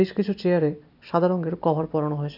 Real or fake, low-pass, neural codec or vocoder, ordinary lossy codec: real; 5.4 kHz; none; none